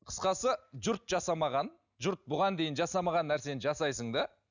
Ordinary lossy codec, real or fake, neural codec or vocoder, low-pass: none; real; none; 7.2 kHz